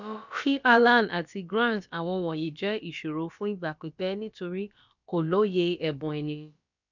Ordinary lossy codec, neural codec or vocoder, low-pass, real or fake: none; codec, 16 kHz, about 1 kbps, DyCAST, with the encoder's durations; 7.2 kHz; fake